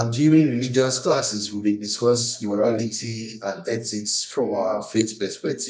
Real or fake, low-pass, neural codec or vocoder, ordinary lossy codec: fake; 10.8 kHz; codec, 24 kHz, 0.9 kbps, WavTokenizer, medium music audio release; none